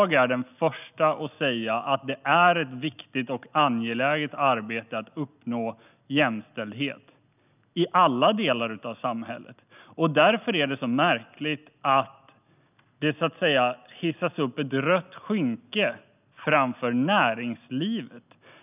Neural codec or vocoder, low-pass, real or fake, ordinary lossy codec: none; 3.6 kHz; real; none